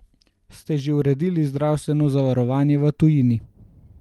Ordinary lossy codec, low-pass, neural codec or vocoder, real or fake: Opus, 32 kbps; 19.8 kHz; none; real